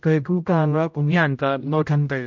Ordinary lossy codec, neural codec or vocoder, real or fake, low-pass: MP3, 64 kbps; codec, 16 kHz, 0.5 kbps, X-Codec, HuBERT features, trained on general audio; fake; 7.2 kHz